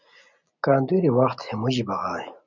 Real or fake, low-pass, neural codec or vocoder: real; 7.2 kHz; none